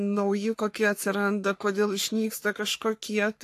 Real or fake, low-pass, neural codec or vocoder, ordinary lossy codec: fake; 14.4 kHz; codec, 44.1 kHz, 3.4 kbps, Pupu-Codec; AAC, 64 kbps